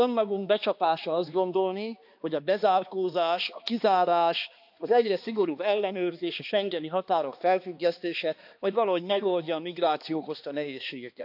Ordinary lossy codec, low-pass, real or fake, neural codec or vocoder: AAC, 48 kbps; 5.4 kHz; fake; codec, 16 kHz, 2 kbps, X-Codec, HuBERT features, trained on balanced general audio